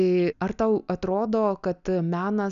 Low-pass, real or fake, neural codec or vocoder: 7.2 kHz; real; none